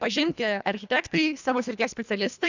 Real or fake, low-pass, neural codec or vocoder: fake; 7.2 kHz; codec, 24 kHz, 1.5 kbps, HILCodec